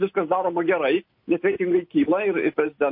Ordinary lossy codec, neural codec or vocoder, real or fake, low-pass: MP3, 32 kbps; none; real; 5.4 kHz